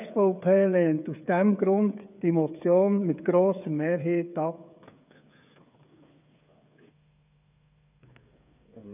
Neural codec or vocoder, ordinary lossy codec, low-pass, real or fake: codec, 16 kHz, 8 kbps, FreqCodec, smaller model; none; 3.6 kHz; fake